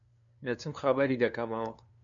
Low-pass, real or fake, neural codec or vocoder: 7.2 kHz; fake; codec, 16 kHz, 2 kbps, FunCodec, trained on LibriTTS, 25 frames a second